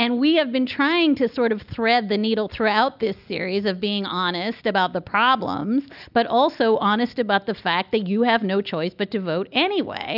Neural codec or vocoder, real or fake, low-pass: none; real; 5.4 kHz